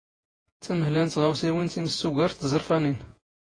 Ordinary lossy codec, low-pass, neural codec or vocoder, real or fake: AAC, 32 kbps; 9.9 kHz; vocoder, 48 kHz, 128 mel bands, Vocos; fake